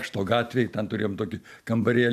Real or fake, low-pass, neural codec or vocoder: real; 14.4 kHz; none